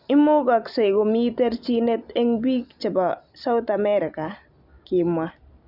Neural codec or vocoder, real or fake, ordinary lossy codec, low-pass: none; real; none; 5.4 kHz